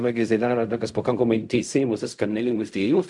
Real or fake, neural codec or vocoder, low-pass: fake; codec, 16 kHz in and 24 kHz out, 0.4 kbps, LongCat-Audio-Codec, fine tuned four codebook decoder; 10.8 kHz